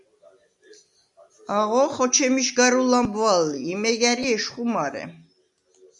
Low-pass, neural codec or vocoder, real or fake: 10.8 kHz; none; real